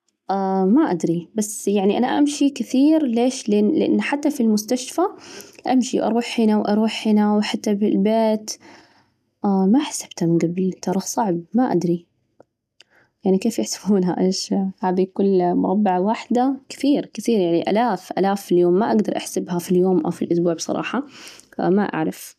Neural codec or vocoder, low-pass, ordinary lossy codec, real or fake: none; 14.4 kHz; none; real